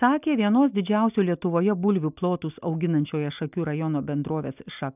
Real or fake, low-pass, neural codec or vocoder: real; 3.6 kHz; none